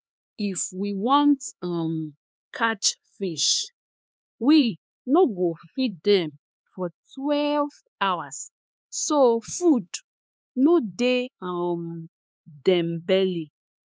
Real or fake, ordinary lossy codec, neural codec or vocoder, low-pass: fake; none; codec, 16 kHz, 4 kbps, X-Codec, HuBERT features, trained on LibriSpeech; none